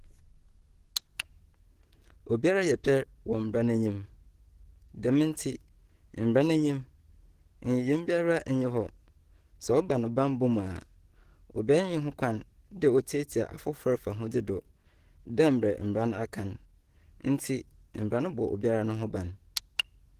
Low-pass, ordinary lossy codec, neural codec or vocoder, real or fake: 14.4 kHz; Opus, 16 kbps; codec, 44.1 kHz, 7.8 kbps, DAC; fake